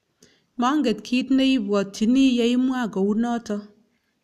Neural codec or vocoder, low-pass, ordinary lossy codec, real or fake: none; 14.4 kHz; none; real